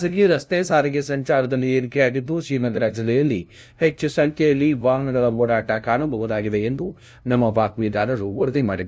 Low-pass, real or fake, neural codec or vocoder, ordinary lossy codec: none; fake; codec, 16 kHz, 0.5 kbps, FunCodec, trained on LibriTTS, 25 frames a second; none